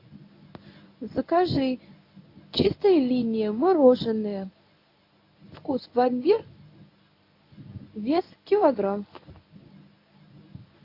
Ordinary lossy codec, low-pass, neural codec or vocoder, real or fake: AAC, 32 kbps; 5.4 kHz; codec, 24 kHz, 0.9 kbps, WavTokenizer, medium speech release version 1; fake